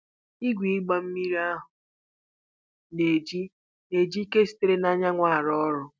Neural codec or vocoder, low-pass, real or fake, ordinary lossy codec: none; none; real; none